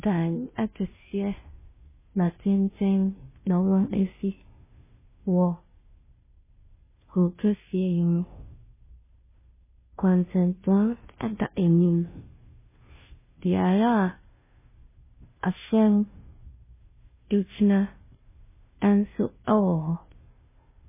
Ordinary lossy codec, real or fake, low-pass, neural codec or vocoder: MP3, 16 kbps; fake; 3.6 kHz; codec, 16 kHz, 0.5 kbps, FunCodec, trained on Chinese and English, 25 frames a second